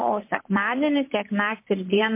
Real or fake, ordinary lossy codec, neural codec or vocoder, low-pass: fake; MP3, 24 kbps; vocoder, 44.1 kHz, 128 mel bands, Pupu-Vocoder; 3.6 kHz